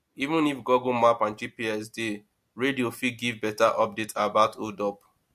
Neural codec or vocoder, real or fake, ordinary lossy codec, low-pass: none; real; MP3, 64 kbps; 14.4 kHz